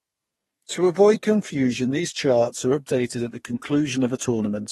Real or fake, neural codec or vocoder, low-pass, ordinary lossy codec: fake; codec, 32 kHz, 1.9 kbps, SNAC; 14.4 kHz; AAC, 32 kbps